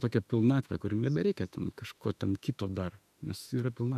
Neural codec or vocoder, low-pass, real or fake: autoencoder, 48 kHz, 32 numbers a frame, DAC-VAE, trained on Japanese speech; 14.4 kHz; fake